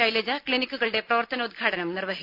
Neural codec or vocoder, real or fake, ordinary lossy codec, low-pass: none; real; none; 5.4 kHz